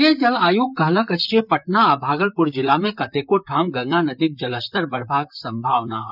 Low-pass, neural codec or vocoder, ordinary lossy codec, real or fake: 5.4 kHz; vocoder, 44.1 kHz, 128 mel bands, Pupu-Vocoder; none; fake